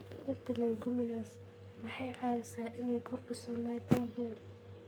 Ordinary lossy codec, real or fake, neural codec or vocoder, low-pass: none; fake; codec, 44.1 kHz, 3.4 kbps, Pupu-Codec; none